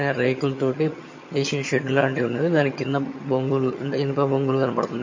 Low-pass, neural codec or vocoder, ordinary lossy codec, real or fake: 7.2 kHz; vocoder, 22.05 kHz, 80 mel bands, HiFi-GAN; MP3, 32 kbps; fake